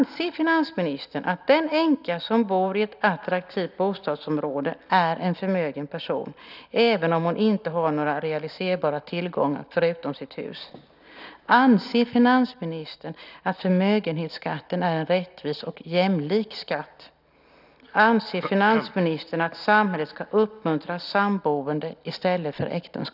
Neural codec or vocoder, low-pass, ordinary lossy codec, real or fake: none; 5.4 kHz; none; real